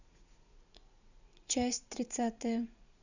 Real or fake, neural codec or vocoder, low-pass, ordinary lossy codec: fake; vocoder, 44.1 kHz, 80 mel bands, Vocos; 7.2 kHz; none